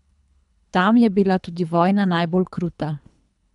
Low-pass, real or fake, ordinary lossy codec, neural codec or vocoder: 10.8 kHz; fake; MP3, 96 kbps; codec, 24 kHz, 3 kbps, HILCodec